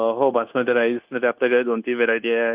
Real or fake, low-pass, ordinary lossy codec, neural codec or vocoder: fake; 3.6 kHz; Opus, 24 kbps; codec, 16 kHz, 0.9 kbps, LongCat-Audio-Codec